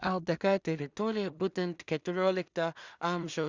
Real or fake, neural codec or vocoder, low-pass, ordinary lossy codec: fake; codec, 16 kHz in and 24 kHz out, 0.4 kbps, LongCat-Audio-Codec, two codebook decoder; 7.2 kHz; Opus, 64 kbps